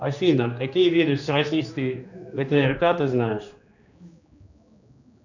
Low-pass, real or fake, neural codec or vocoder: 7.2 kHz; fake; codec, 16 kHz, 2 kbps, X-Codec, HuBERT features, trained on general audio